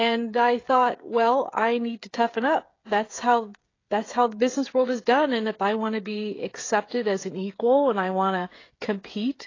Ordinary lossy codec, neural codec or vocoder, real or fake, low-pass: AAC, 32 kbps; codec, 16 kHz, 16 kbps, FreqCodec, smaller model; fake; 7.2 kHz